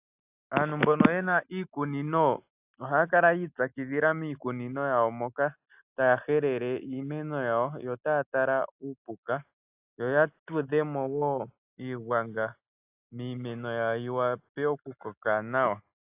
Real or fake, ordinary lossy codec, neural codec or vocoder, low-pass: real; AAC, 32 kbps; none; 3.6 kHz